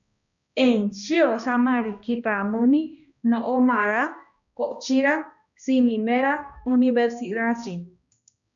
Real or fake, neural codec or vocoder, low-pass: fake; codec, 16 kHz, 1 kbps, X-Codec, HuBERT features, trained on balanced general audio; 7.2 kHz